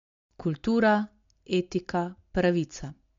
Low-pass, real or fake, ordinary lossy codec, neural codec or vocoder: 7.2 kHz; real; MP3, 48 kbps; none